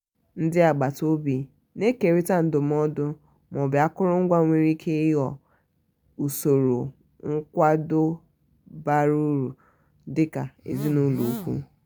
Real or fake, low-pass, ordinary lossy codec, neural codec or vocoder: real; none; none; none